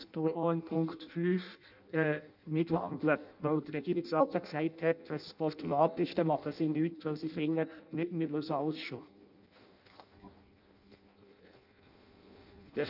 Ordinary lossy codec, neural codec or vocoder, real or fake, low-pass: none; codec, 16 kHz in and 24 kHz out, 0.6 kbps, FireRedTTS-2 codec; fake; 5.4 kHz